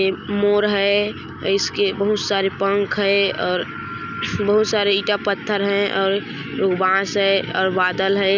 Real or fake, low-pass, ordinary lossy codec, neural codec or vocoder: real; 7.2 kHz; none; none